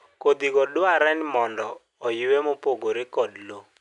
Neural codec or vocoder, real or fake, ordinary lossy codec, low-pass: none; real; none; 10.8 kHz